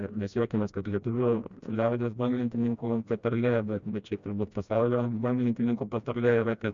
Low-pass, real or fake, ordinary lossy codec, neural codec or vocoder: 7.2 kHz; fake; Opus, 64 kbps; codec, 16 kHz, 1 kbps, FreqCodec, smaller model